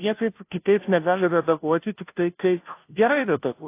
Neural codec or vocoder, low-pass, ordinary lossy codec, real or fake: codec, 16 kHz, 0.5 kbps, FunCodec, trained on Chinese and English, 25 frames a second; 3.6 kHz; AAC, 24 kbps; fake